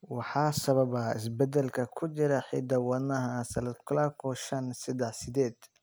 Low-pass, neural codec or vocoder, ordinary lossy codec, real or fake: none; none; none; real